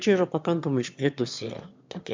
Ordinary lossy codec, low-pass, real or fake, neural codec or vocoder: MP3, 64 kbps; 7.2 kHz; fake; autoencoder, 22.05 kHz, a latent of 192 numbers a frame, VITS, trained on one speaker